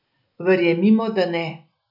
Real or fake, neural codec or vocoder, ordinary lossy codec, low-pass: real; none; none; 5.4 kHz